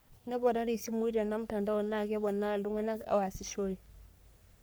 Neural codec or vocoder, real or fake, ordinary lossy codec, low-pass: codec, 44.1 kHz, 3.4 kbps, Pupu-Codec; fake; none; none